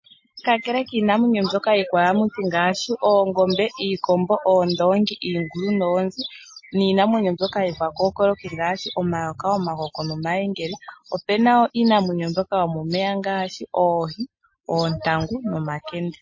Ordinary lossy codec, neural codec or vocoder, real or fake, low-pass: MP3, 32 kbps; none; real; 7.2 kHz